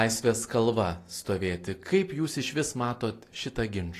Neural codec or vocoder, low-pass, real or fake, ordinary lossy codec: none; 14.4 kHz; real; AAC, 48 kbps